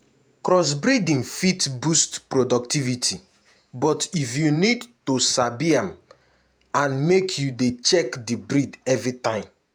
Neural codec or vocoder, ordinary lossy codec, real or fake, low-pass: vocoder, 48 kHz, 128 mel bands, Vocos; none; fake; none